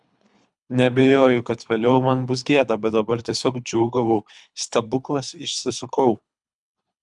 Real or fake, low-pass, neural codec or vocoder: fake; 10.8 kHz; codec, 24 kHz, 3 kbps, HILCodec